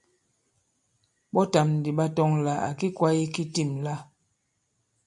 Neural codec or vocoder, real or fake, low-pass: none; real; 10.8 kHz